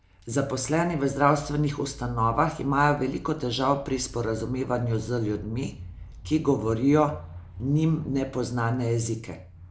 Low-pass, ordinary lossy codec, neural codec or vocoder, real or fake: none; none; none; real